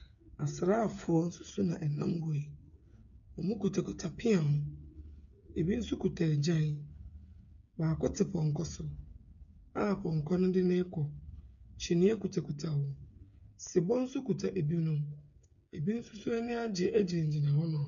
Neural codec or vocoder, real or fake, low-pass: codec, 16 kHz, 8 kbps, FreqCodec, smaller model; fake; 7.2 kHz